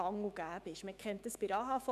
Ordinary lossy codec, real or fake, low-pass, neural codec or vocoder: none; fake; 14.4 kHz; autoencoder, 48 kHz, 128 numbers a frame, DAC-VAE, trained on Japanese speech